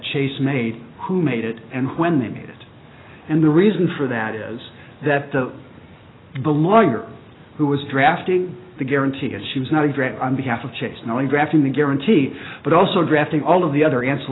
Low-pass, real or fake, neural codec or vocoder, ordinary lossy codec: 7.2 kHz; real; none; AAC, 16 kbps